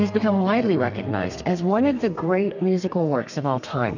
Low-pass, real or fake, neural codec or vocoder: 7.2 kHz; fake; codec, 32 kHz, 1.9 kbps, SNAC